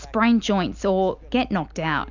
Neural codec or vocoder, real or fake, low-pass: none; real; 7.2 kHz